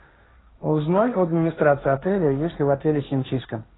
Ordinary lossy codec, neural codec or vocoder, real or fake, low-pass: AAC, 16 kbps; codec, 16 kHz, 1.1 kbps, Voila-Tokenizer; fake; 7.2 kHz